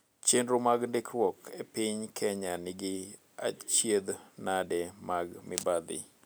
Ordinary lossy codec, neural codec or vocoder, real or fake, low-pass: none; none; real; none